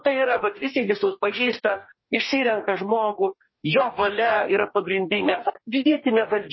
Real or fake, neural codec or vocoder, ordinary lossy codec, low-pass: fake; codec, 44.1 kHz, 2.6 kbps, DAC; MP3, 24 kbps; 7.2 kHz